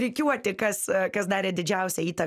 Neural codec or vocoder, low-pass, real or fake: none; 14.4 kHz; real